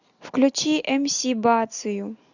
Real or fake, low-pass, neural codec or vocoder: real; 7.2 kHz; none